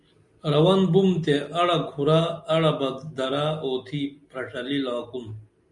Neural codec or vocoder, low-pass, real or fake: none; 10.8 kHz; real